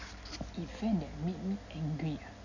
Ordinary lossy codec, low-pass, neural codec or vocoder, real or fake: none; 7.2 kHz; none; real